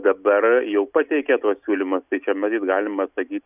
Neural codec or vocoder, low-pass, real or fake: none; 3.6 kHz; real